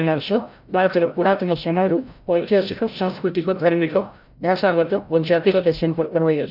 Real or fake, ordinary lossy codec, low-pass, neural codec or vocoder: fake; none; 5.4 kHz; codec, 16 kHz, 0.5 kbps, FreqCodec, larger model